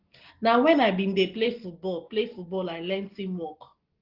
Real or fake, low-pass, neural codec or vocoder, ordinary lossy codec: real; 5.4 kHz; none; Opus, 16 kbps